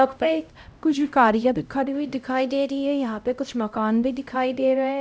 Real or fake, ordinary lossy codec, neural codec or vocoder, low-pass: fake; none; codec, 16 kHz, 0.5 kbps, X-Codec, HuBERT features, trained on LibriSpeech; none